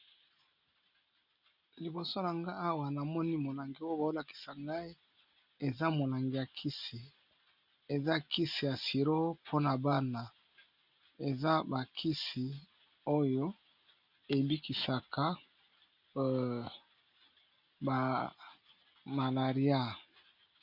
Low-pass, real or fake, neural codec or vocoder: 5.4 kHz; real; none